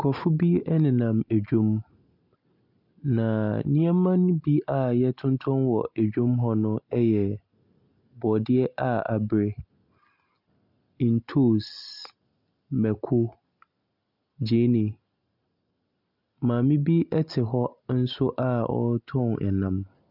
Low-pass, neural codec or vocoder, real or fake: 5.4 kHz; none; real